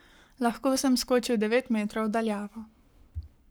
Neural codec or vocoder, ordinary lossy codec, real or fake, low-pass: codec, 44.1 kHz, 7.8 kbps, Pupu-Codec; none; fake; none